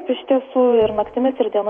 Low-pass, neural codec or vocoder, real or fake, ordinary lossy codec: 19.8 kHz; vocoder, 48 kHz, 128 mel bands, Vocos; fake; MP3, 48 kbps